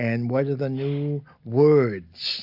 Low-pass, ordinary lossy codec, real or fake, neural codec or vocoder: 5.4 kHz; AAC, 48 kbps; real; none